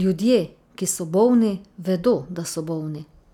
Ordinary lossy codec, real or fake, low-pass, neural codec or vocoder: none; real; 19.8 kHz; none